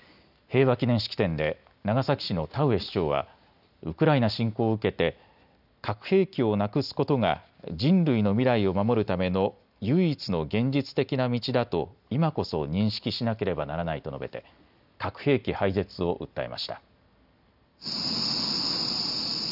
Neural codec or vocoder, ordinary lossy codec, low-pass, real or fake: none; none; 5.4 kHz; real